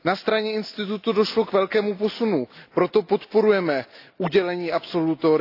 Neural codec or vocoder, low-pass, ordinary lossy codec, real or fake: none; 5.4 kHz; AAC, 32 kbps; real